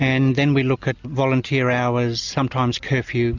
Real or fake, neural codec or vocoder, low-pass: real; none; 7.2 kHz